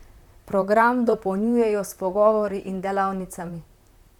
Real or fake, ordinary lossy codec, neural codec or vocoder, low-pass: fake; none; vocoder, 44.1 kHz, 128 mel bands, Pupu-Vocoder; 19.8 kHz